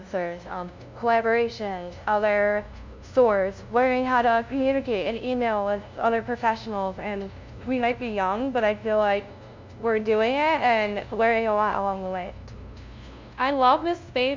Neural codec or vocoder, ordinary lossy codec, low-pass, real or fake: codec, 16 kHz, 0.5 kbps, FunCodec, trained on LibriTTS, 25 frames a second; MP3, 64 kbps; 7.2 kHz; fake